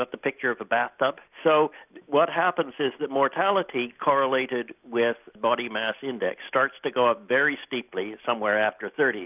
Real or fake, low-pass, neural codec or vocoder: real; 3.6 kHz; none